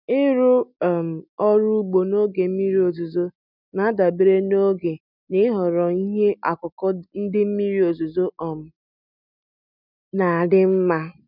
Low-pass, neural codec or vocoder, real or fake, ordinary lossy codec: 5.4 kHz; none; real; none